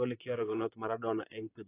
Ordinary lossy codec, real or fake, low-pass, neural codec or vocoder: AAC, 16 kbps; fake; 3.6 kHz; vocoder, 44.1 kHz, 128 mel bands, Pupu-Vocoder